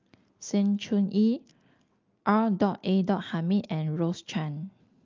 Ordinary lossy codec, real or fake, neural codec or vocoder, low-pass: Opus, 24 kbps; real; none; 7.2 kHz